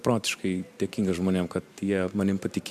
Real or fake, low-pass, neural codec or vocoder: fake; 14.4 kHz; vocoder, 44.1 kHz, 128 mel bands every 512 samples, BigVGAN v2